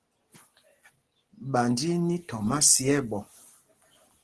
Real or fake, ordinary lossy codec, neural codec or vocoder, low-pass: real; Opus, 16 kbps; none; 10.8 kHz